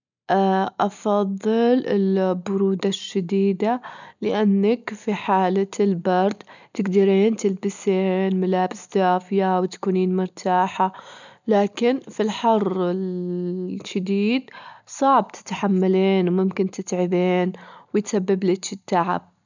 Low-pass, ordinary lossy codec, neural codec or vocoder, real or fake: 7.2 kHz; none; none; real